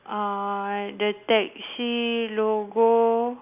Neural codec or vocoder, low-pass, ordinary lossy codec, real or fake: none; 3.6 kHz; none; real